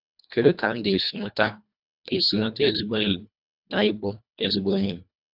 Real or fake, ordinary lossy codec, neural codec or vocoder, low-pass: fake; none; codec, 24 kHz, 1.5 kbps, HILCodec; 5.4 kHz